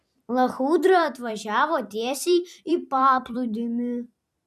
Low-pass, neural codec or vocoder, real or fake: 14.4 kHz; vocoder, 44.1 kHz, 128 mel bands every 256 samples, BigVGAN v2; fake